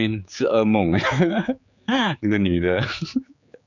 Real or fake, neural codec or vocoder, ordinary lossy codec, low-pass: fake; codec, 16 kHz, 4 kbps, X-Codec, HuBERT features, trained on general audio; none; 7.2 kHz